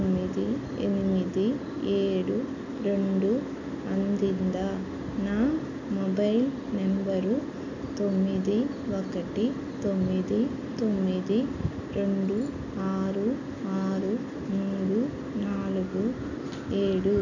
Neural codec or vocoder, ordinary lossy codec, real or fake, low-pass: none; none; real; 7.2 kHz